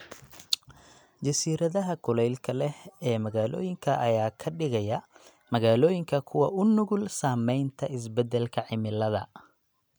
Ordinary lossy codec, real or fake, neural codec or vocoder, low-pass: none; real; none; none